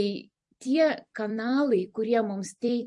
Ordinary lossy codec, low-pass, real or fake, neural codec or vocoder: MP3, 48 kbps; 10.8 kHz; fake; vocoder, 44.1 kHz, 128 mel bands every 256 samples, BigVGAN v2